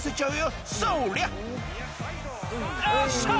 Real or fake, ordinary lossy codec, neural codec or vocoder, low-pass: real; none; none; none